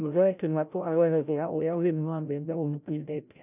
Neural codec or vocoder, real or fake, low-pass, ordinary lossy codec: codec, 16 kHz, 0.5 kbps, FreqCodec, larger model; fake; 3.6 kHz; none